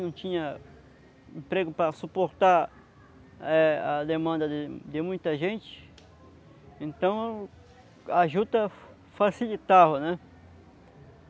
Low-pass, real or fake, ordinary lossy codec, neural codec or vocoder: none; real; none; none